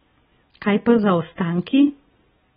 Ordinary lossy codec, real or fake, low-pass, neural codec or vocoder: AAC, 16 kbps; real; 19.8 kHz; none